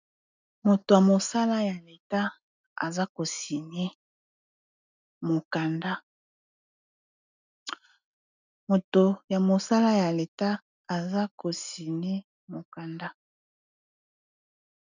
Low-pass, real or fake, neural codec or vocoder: 7.2 kHz; real; none